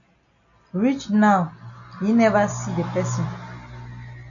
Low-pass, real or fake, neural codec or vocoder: 7.2 kHz; real; none